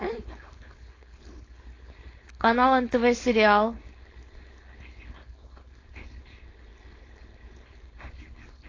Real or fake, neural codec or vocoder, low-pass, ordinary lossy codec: fake; codec, 16 kHz, 4.8 kbps, FACodec; 7.2 kHz; AAC, 32 kbps